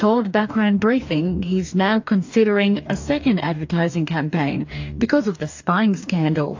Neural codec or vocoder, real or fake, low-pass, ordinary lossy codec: codec, 44.1 kHz, 2.6 kbps, DAC; fake; 7.2 kHz; AAC, 48 kbps